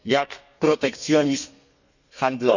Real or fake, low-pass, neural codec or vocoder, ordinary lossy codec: fake; 7.2 kHz; codec, 32 kHz, 1.9 kbps, SNAC; none